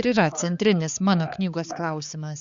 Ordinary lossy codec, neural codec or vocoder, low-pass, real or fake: Opus, 64 kbps; codec, 16 kHz, 4 kbps, X-Codec, HuBERT features, trained on balanced general audio; 7.2 kHz; fake